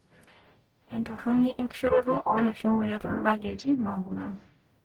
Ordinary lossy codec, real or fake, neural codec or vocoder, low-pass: Opus, 24 kbps; fake; codec, 44.1 kHz, 0.9 kbps, DAC; 19.8 kHz